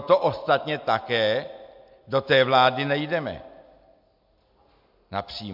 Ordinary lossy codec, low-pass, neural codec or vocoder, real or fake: MP3, 48 kbps; 5.4 kHz; none; real